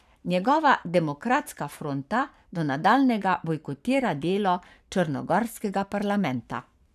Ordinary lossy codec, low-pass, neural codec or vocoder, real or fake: none; 14.4 kHz; codec, 44.1 kHz, 7.8 kbps, Pupu-Codec; fake